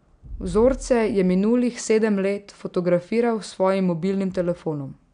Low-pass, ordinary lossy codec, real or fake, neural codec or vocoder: 9.9 kHz; none; real; none